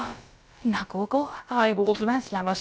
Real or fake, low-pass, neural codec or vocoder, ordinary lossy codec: fake; none; codec, 16 kHz, about 1 kbps, DyCAST, with the encoder's durations; none